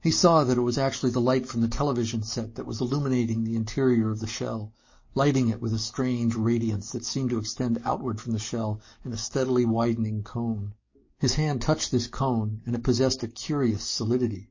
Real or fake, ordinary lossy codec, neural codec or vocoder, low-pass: fake; MP3, 32 kbps; codec, 44.1 kHz, 7.8 kbps, DAC; 7.2 kHz